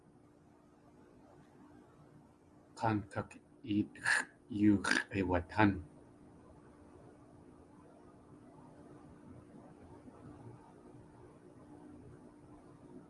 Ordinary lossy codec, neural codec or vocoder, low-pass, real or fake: Opus, 32 kbps; none; 10.8 kHz; real